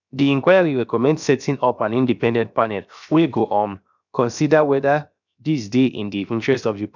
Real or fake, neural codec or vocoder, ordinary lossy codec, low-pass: fake; codec, 16 kHz, 0.7 kbps, FocalCodec; none; 7.2 kHz